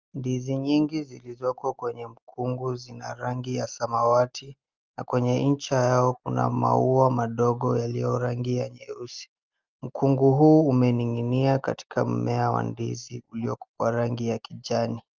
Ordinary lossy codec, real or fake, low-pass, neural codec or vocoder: Opus, 24 kbps; real; 7.2 kHz; none